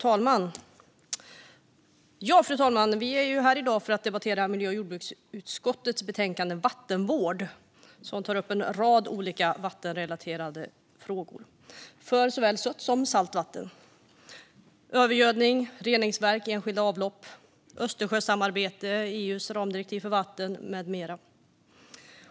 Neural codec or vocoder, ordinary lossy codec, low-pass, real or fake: none; none; none; real